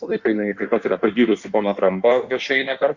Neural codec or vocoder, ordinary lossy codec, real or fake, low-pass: codec, 16 kHz in and 24 kHz out, 1.1 kbps, FireRedTTS-2 codec; Opus, 64 kbps; fake; 7.2 kHz